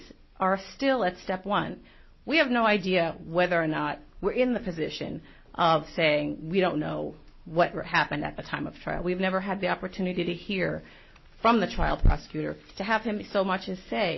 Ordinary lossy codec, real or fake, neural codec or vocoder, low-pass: MP3, 24 kbps; real; none; 7.2 kHz